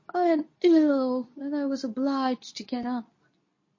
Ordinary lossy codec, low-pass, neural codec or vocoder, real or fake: MP3, 32 kbps; 7.2 kHz; codec, 24 kHz, 0.9 kbps, WavTokenizer, medium speech release version 2; fake